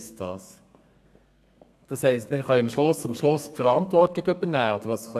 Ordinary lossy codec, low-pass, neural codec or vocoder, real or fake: AAC, 96 kbps; 14.4 kHz; codec, 32 kHz, 1.9 kbps, SNAC; fake